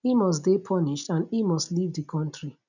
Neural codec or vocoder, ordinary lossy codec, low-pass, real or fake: none; none; 7.2 kHz; real